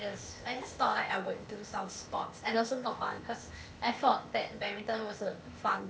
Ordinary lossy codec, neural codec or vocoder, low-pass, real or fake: none; codec, 16 kHz, 0.8 kbps, ZipCodec; none; fake